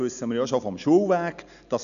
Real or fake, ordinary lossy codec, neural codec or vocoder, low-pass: real; none; none; 7.2 kHz